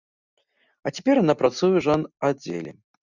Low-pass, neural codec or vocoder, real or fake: 7.2 kHz; none; real